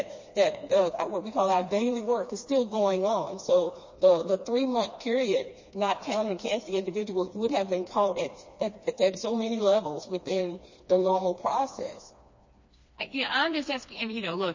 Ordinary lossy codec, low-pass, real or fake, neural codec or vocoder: MP3, 32 kbps; 7.2 kHz; fake; codec, 16 kHz, 2 kbps, FreqCodec, smaller model